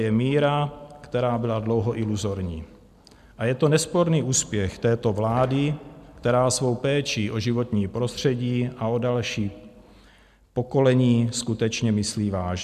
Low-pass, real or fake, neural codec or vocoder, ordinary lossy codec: 14.4 kHz; real; none; MP3, 96 kbps